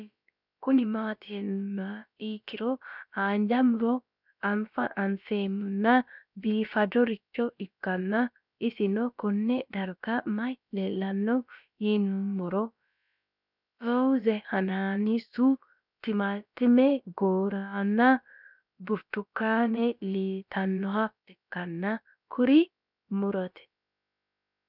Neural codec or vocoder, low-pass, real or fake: codec, 16 kHz, about 1 kbps, DyCAST, with the encoder's durations; 5.4 kHz; fake